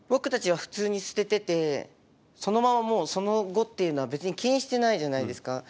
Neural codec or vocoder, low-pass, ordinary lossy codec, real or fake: none; none; none; real